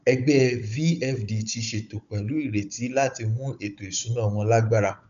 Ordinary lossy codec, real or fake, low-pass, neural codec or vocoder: none; fake; 7.2 kHz; codec, 16 kHz, 16 kbps, FunCodec, trained on Chinese and English, 50 frames a second